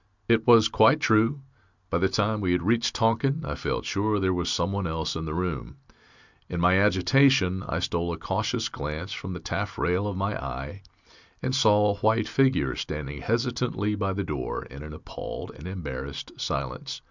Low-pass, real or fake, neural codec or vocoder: 7.2 kHz; real; none